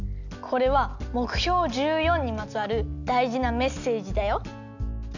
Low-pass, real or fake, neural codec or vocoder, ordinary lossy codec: 7.2 kHz; real; none; none